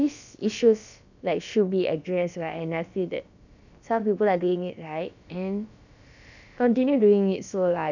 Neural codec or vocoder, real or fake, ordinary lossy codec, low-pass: codec, 16 kHz, about 1 kbps, DyCAST, with the encoder's durations; fake; none; 7.2 kHz